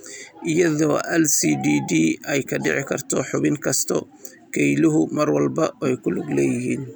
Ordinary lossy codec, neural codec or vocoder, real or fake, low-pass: none; none; real; none